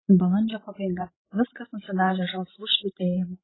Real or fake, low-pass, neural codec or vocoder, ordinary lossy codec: fake; 7.2 kHz; codec, 16 kHz, 16 kbps, FreqCodec, larger model; AAC, 16 kbps